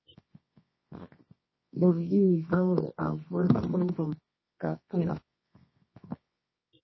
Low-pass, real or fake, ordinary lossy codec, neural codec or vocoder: 7.2 kHz; fake; MP3, 24 kbps; codec, 24 kHz, 0.9 kbps, WavTokenizer, medium music audio release